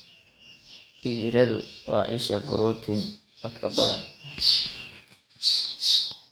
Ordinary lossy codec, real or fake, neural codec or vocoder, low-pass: none; fake; codec, 44.1 kHz, 2.6 kbps, DAC; none